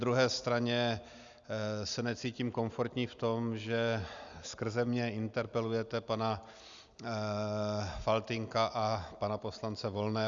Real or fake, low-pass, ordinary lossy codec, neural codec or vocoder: real; 7.2 kHz; Opus, 64 kbps; none